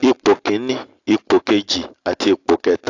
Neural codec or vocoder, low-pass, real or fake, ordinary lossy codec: none; 7.2 kHz; real; AAC, 48 kbps